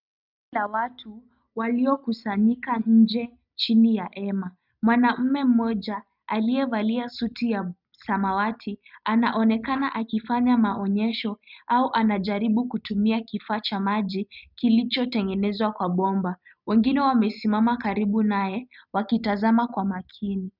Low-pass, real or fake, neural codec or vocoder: 5.4 kHz; real; none